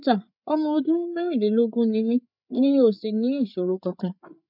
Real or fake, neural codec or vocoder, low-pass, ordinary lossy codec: fake; codec, 16 kHz, 8 kbps, FreqCodec, larger model; 5.4 kHz; none